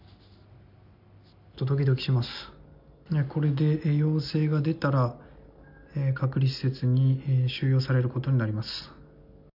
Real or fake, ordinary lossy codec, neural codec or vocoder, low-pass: real; none; none; 5.4 kHz